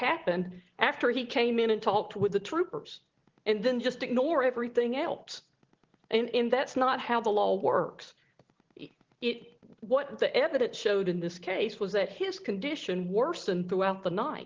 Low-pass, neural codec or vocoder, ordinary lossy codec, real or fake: 7.2 kHz; none; Opus, 16 kbps; real